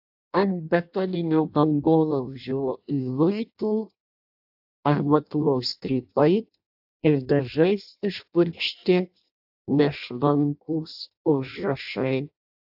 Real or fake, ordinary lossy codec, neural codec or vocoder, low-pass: fake; AAC, 48 kbps; codec, 16 kHz in and 24 kHz out, 0.6 kbps, FireRedTTS-2 codec; 5.4 kHz